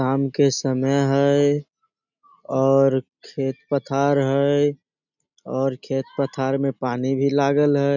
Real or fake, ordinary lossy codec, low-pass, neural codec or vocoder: real; none; 7.2 kHz; none